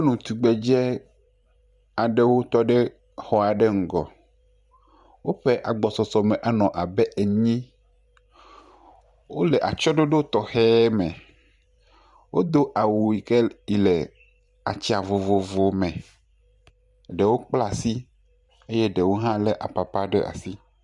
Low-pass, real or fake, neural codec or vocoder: 10.8 kHz; fake; vocoder, 44.1 kHz, 128 mel bands every 512 samples, BigVGAN v2